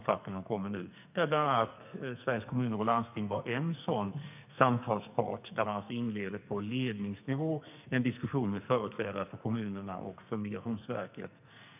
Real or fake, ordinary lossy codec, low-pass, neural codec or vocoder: fake; none; 3.6 kHz; codec, 44.1 kHz, 2.6 kbps, SNAC